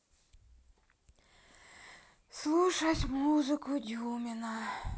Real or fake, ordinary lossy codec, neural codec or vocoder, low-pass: real; none; none; none